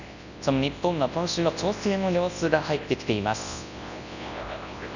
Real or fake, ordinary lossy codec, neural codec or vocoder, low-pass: fake; none; codec, 24 kHz, 0.9 kbps, WavTokenizer, large speech release; 7.2 kHz